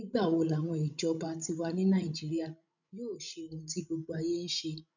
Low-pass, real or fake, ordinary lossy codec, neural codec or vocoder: 7.2 kHz; fake; none; codec, 16 kHz, 16 kbps, FreqCodec, larger model